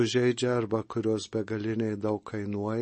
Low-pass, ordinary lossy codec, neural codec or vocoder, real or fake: 10.8 kHz; MP3, 32 kbps; none; real